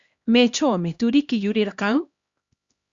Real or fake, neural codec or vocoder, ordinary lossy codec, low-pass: fake; codec, 16 kHz, 1 kbps, X-Codec, HuBERT features, trained on LibriSpeech; Opus, 64 kbps; 7.2 kHz